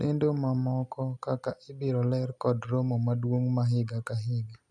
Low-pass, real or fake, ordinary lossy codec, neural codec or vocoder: none; real; none; none